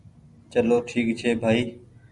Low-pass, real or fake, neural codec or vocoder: 10.8 kHz; real; none